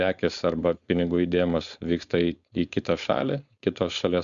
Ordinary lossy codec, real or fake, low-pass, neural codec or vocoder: Opus, 64 kbps; fake; 7.2 kHz; codec, 16 kHz, 4.8 kbps, FACodec